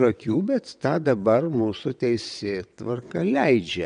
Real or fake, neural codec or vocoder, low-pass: fake; vocoder, 22.05 kHz, 80 mel bands, WaveNeXt; 9.9 kHz